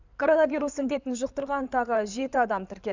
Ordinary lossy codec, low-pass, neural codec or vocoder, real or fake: none; 7.2 kHz; codec, 16 kHz in and 24 kHz out, 2.2 kbps, FireRedTTS-2 codec; fake